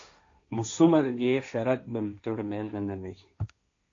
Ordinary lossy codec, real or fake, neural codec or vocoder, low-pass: AAC, 48 kbps; fake; codec, 16 kHz, 1.1 kbps, Voila-Tokenizer; 7.2 kHz